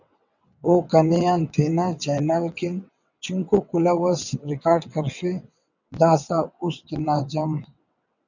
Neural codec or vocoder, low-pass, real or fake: vocoder, 22.05 kHz, 80 mel bands, WaveNeXt; 7.2 kHz; fake